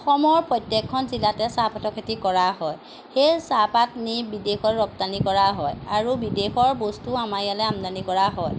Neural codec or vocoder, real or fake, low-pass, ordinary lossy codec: none; real; none; none